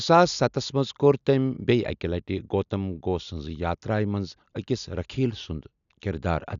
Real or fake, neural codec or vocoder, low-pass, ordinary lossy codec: real; none; 7.2 kHz; none